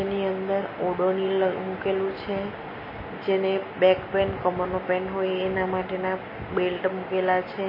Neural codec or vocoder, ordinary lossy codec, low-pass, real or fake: none; MP3, 24 kbps; 5.4 kHz; real